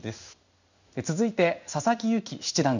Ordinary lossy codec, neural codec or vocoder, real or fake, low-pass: none; codec, 16 kHz in and 24 kHz out, 1 kbps, XY-Tokenizer; fake; 7.2 kHz